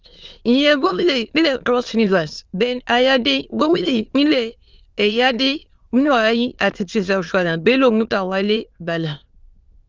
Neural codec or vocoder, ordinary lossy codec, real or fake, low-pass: autoencoder, 22.05 kHz, a latent of 192 numbers a frame, VITS, trained on many speakers; Opus, 32 kbps; fake; 7.2 kHz